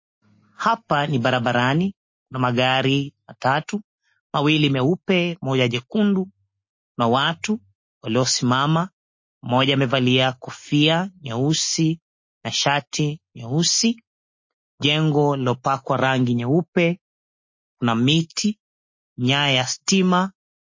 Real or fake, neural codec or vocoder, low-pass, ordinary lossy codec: real; none; 7.2 kHz; MP3, 32 kbps